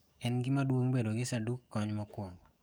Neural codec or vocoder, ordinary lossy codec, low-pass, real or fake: codec, 44.1 kHz, 7.8 kbps, DAC; none; none; fake